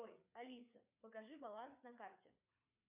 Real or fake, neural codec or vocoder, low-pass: fake; codec, 16 kHz, 4 kbps, FreqCodec, larger model; 3.6 kHz